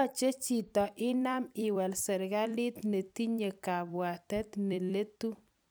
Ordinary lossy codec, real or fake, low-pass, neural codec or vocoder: none; fake; none; vocoder, 44.1 kHz, 128 mel bands every 256 samples, BigVGAN v2